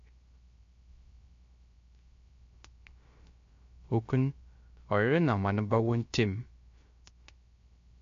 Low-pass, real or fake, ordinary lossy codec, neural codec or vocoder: 7.2 kHz; fake; MP3, 48 kbps; codec, 16 kHz, 0.3 kbps, FocalCodec